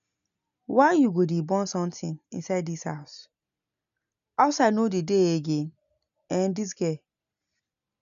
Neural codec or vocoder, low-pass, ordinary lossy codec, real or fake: none; 7.2 kHz; none; real